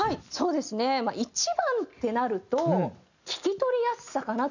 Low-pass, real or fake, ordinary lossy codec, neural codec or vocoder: 7.2 kHz; real; none; none